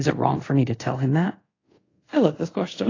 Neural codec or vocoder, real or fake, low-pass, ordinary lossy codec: codec, 24 kHz, 0.5 kbps, DualCodec; fake; 7.2 kHz; AAC, 32 kbps